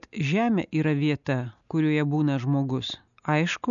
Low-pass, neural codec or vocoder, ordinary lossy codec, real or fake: 7.2 kHz; none; MP3, 96 kbps; real